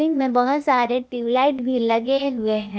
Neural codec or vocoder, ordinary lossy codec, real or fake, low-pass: codec, 16 kHz, 0.8 kbps, ZipCodec; none; fake; none